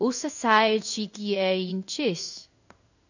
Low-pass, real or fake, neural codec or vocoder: 7.2 kHz; fake; codec, 16 kHz in and 24 kHz out, 1 kbps, XY-Tokenizer